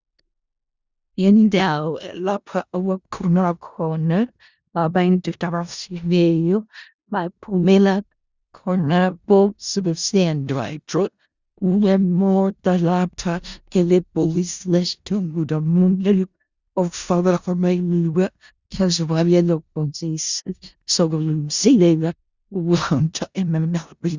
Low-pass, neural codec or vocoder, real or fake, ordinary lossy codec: 7.2 kHz; codec, 16 kHz in and 24 kHz out, 0.4 kbps, LongCat-Audio-Codec, four codebook decoder; fake; Opus, 64 kbps